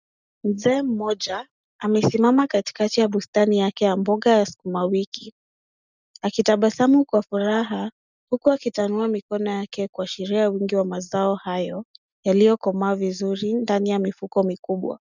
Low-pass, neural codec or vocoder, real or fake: 7.2 kHz; none; real